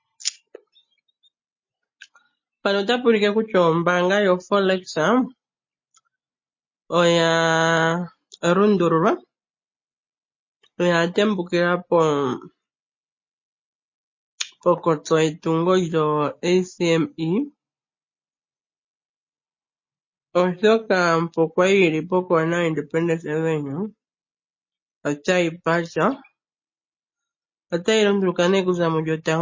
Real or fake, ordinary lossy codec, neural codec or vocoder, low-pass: real; MP3, 32 kbps; none; 7.2 kHz